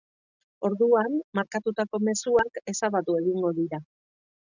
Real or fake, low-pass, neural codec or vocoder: real; 7.2 kHz; none